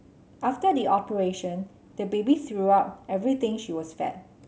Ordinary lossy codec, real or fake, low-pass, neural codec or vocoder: none; real; none; none